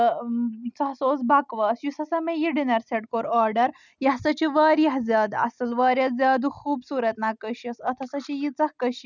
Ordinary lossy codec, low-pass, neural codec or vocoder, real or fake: none; 7.2 kHz; none; real